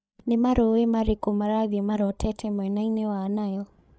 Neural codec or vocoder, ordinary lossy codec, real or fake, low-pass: codec, 16 kHz, 16 kbps, FreqCodec, larger model; none; fake; none